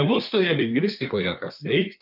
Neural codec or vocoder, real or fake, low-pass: codec, 24 kHz, 1 kbps, SNAC; fake; 5.4 kHz